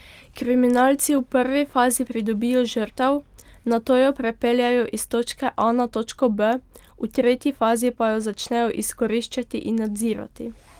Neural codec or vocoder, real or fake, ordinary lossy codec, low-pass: none; real; Opus, 24 kbps; 19.8 kHz